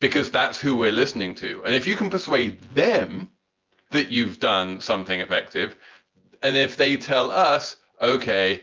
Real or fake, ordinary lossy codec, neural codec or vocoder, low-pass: fake; Opus, 32 kbps; vocoder, 24 kHz, 100 mel bands, Vocos; 7.2 kHz